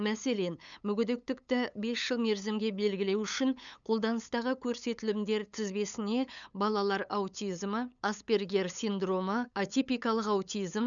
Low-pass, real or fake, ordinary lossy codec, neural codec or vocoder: 7.2 kHz; fake; none; codec, 16 kHz, 8 kbps, FunCodec, trained on LibriTTS, 25 frames a second